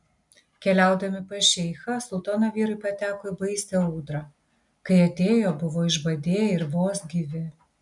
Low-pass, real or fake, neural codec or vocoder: 10.8 kHz; real; none